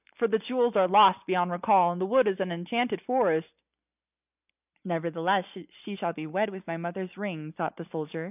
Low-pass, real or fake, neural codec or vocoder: 3.6 kHz; real; none